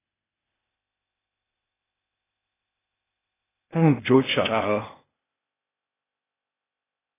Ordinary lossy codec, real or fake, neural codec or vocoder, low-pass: AAC, 16 kbps; fake; codec, 16 kHz, 0.8 kbps, ZipCodec; 3.6 kHz